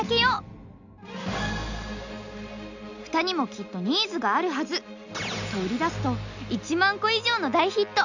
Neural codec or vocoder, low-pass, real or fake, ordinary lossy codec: none; 7.2 kHz; real; none